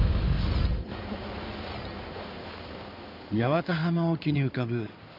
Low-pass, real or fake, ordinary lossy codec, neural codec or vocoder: 5.4 kHz; fake; none; codec, 16 kHz in and 24 kHz out, 2.2 kbps, FireRedTTS-2 codec